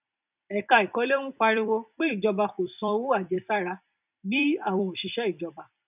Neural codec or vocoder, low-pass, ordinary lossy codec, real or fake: vocoder, 44.1 kHz, 128 mel bands every 256 samples, BigVGAN v2; 3.6 kHz; none; fake